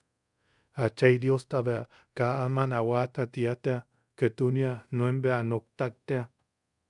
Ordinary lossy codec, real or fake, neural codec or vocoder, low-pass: MP3, 96 kbps; fake; codec, 24 kHz, 0.5 kbps, DualCodec; 10.8 kHz